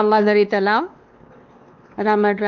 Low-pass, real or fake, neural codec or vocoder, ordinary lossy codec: 7.2 kHz; fake; codec, 16 kHz, 2 kbps, X-Codec, WavLM features, trained on Multilingual LibriSpeech; Opus, 24 kbps